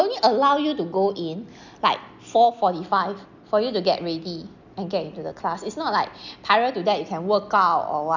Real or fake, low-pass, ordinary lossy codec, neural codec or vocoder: real; 7.2 kHz; none; none